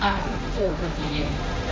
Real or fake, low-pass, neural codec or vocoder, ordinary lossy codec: fake; none; codec, 16 kHz, 1.1 kbps, Voila-Tokenizer; none